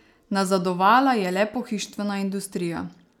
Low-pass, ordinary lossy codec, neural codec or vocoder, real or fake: 19.8 kHz; none; none; real